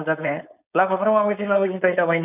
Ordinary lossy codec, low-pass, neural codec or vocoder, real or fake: none; 3.6 kHz; codec, 16 kHz, 4.8 kbps, FACodec; fake